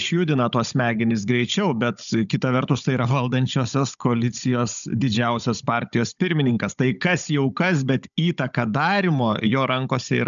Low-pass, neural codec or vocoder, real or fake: 7.2 kHz; codec, 16 kHz, 16 kbps, FunCodec, trained on Chinese and English, 50 frames a second; fake